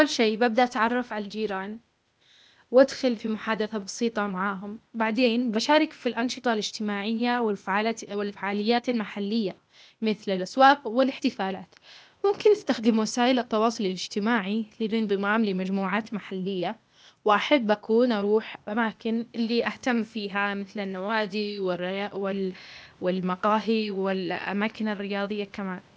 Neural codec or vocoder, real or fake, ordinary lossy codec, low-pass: codec, 16 kHz, 0.8 kbps, ZipCodec; fake; none; none